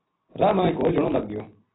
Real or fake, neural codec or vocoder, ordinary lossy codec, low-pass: real; none; AAC, 16 kbps; 7.2 kHz